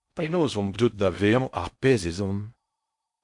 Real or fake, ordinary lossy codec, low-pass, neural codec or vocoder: fake; MP3, 64 kbps; 10.8 kHz; codec, 16 kHz in and 24 kHz out, 0.6 kbps, FocalCodec, streaming, 4096 codes